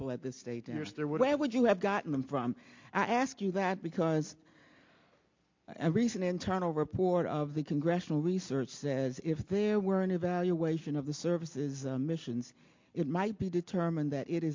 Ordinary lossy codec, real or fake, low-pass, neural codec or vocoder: MP3, 48 kbps; real; 7.2 kHz; none